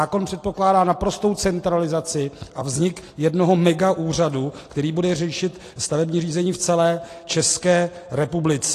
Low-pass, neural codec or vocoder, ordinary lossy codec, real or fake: 14.4 kHz; none; AAC, 48 kbps; real